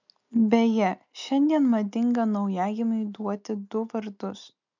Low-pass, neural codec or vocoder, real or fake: 7.2 kHz; none; real